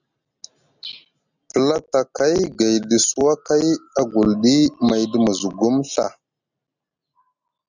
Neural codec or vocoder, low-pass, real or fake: none; 7.2 kHz; real